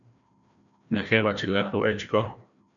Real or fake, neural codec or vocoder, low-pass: fake; codec, 16 kHz, 1 kbps, FreqCodec, larger model; 7.2 kHz